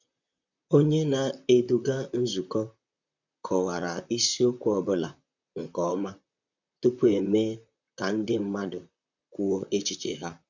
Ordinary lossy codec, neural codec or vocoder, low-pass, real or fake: none; vocoder, 44.1 kHz, 128 mel bands, Pupu-Vocoder; 7.2 kHz; fake